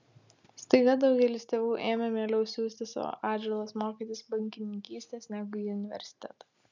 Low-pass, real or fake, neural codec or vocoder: 7.2 kHz; real; none